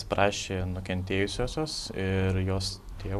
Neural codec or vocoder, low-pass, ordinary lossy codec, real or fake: none; 14.4 kHz; AAC, 96 kbps; real